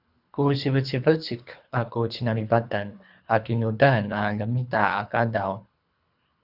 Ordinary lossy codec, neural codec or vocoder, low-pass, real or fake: Opus, 64 kbps; codec, 24 kHz, 3 kbps, HILCodec; 5.4 kHz; fake